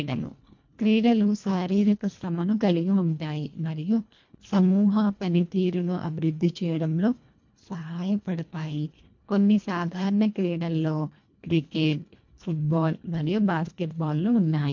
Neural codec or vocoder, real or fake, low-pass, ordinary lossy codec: codec, 24 kHz, 1.5 kbps, HILCodec; fake; 7.2 kHz; MP3, 48 kbps